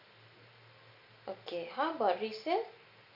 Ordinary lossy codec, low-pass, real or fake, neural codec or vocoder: none; 5.4 kHz; real; none